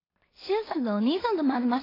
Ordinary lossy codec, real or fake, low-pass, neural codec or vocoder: AAC, 24 kbps; fake; 5.4 kHz; codec, 16 kHz in and 24 kHz out, 0.4 kbps, LongCat-Audio-Codec, two codebook decoder